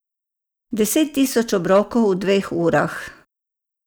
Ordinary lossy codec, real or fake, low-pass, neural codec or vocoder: none; real; none; none